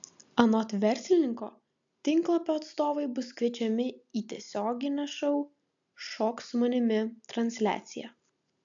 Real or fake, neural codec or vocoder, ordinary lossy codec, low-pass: real; none; AAC, 64 kbps; 7.2 kHz